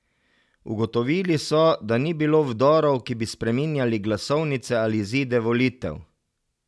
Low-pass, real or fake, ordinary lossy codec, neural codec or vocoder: none; real; none; none